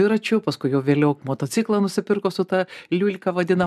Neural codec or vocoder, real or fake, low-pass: none; real; 14.4 kHz